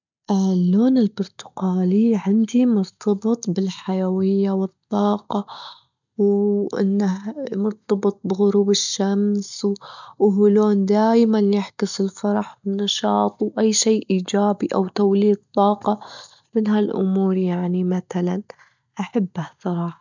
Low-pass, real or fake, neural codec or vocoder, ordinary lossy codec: 7.2 kHz; real; none; none